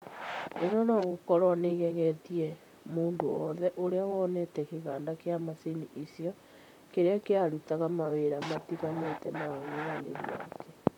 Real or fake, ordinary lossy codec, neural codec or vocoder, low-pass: fake; none; vocoder, 44.1 kHz, 128 mel bands, Pupu-Vocoder; 19.8 kHz